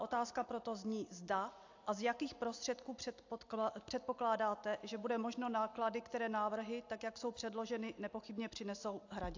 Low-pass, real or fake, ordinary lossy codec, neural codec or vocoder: 7.2 kHz; real; AAC, 48 kbps; none